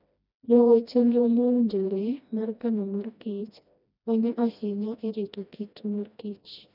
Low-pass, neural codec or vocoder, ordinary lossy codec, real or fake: 5.4 kHz; codec, 16 kHz, 1 kbps, FreqCodec, smaller model; none; fake